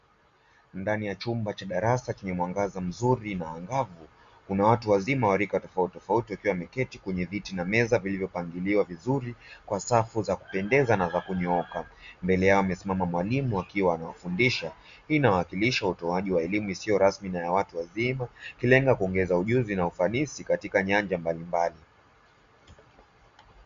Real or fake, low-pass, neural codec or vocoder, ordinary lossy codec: real; 7.2 kHz; none; Opus, 64 kbps